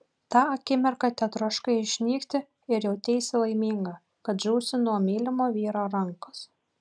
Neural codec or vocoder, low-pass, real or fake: none; 9.9 kHz; real